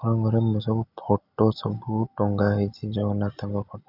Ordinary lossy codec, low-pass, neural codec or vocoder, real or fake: none; 5.4 kHz; none; real